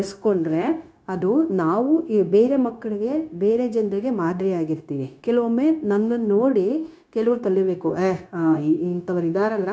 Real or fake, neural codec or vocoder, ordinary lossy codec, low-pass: fake; codec, 16 kHz, 0.9 kbps, LongCat-Audio-Codec; none; none